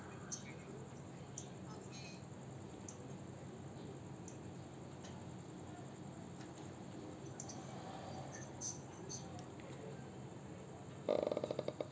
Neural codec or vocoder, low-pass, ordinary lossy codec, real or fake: none; none; none; real